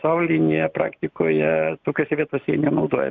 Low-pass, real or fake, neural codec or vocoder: 7.2 kHz; real; none